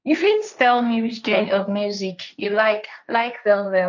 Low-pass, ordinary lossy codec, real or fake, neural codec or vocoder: 7.2 kHz; none; fake; codec, 16 kHz, 1.1 kbps, Voila-Tokenizer